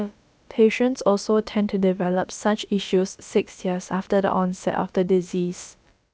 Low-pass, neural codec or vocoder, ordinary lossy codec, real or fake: none; codec, 16 kHz, about 1 kbps, DyCAST, with the encoder's durations; none; fake